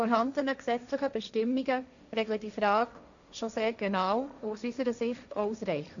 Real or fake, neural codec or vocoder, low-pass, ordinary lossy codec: fake; codec, 16 kHz, 1.1 kbps, Voila-Tokenizer; 7.2 kHz; none